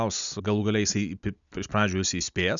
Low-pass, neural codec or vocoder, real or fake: 7.2 kHz; none; real